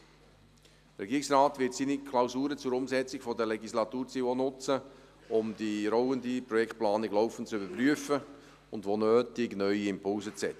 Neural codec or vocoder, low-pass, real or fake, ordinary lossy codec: none; 14.4 kHz; real; none